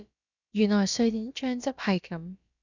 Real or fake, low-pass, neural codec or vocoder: fake; 7.2 kHz; codec, 16 kHz, about 1 kbps, DyCAST, with the encoder's durations